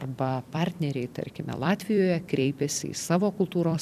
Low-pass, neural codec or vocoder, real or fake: 14.4 kHz; vocoder, 44.1 kHz, 128 mel bands every 256 samples, BigVGAN v2; fake